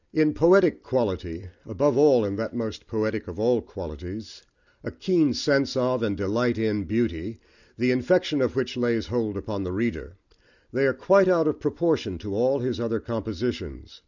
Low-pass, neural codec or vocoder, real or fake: 7.2 kHz; none; real